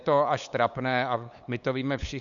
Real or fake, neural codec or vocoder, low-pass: fake; codec, 16 kHz, 8 kbps, FunCodec, trained on LibriTTS, 25 frames a second; 7.2 kHz